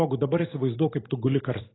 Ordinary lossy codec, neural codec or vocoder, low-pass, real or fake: AAC, 16 kbps; none; 7.2 kHz; real